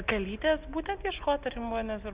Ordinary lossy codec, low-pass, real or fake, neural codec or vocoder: Opus, 64 kbps; 3.6 kHz; real; none